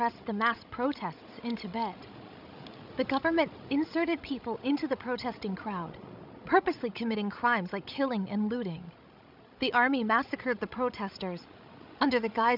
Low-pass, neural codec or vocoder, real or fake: 5.4 kHz; codec, 16 kHz, 16 kbps, FreqCodec, larger model; fake